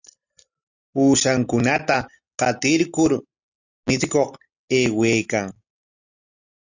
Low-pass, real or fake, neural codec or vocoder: 7.2 kHz; real; none